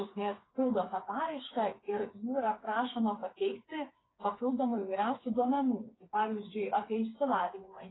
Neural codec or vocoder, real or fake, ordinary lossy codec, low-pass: codec, 16 kHz, 4 kbps, FreqCodec, smaller model; fake; AAC, 16 kbps; 7.2 kHz